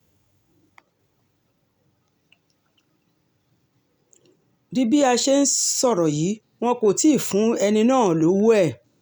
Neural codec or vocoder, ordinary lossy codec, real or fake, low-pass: none; none; real; none